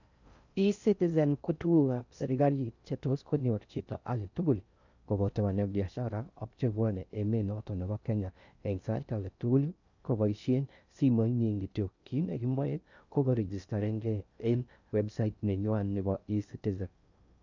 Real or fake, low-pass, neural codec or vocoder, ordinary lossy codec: fake; 7.2 kHz; codec, 16 kHz in and 24 kHz out, 0.6 kbps, FocalCodec, streaming, 2048 codes; none